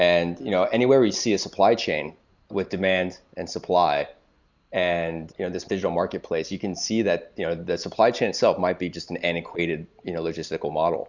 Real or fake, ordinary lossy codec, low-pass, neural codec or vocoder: real; Opus, 64 kbps; 7.2 kHz; none